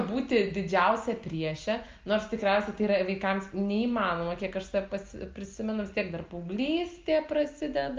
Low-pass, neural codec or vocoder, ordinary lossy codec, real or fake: 7.2 kHz; none; Opus, 32 kbps; real